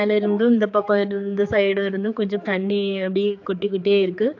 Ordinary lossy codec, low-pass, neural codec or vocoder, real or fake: AAC, 48 kbps; 7.2 kHz; codec, 16 kHz, 4 kbps, X-Codec, HuBERT features, trained on general audio; fake